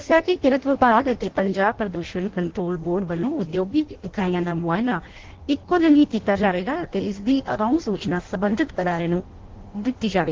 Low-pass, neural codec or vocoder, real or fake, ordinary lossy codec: 7.2 kHz; codec, 16 kHz in and 24 kHz out, 0.6 kbps, FireRedTTS-2 codec; fake; Opus, 16 kbps